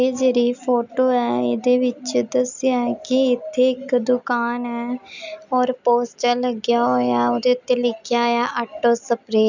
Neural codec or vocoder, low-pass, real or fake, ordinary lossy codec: none; 7.2 kHz; real; none